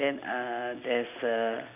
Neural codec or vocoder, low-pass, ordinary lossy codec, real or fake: none; 3.6 kHz; MP3, 32 kbps; real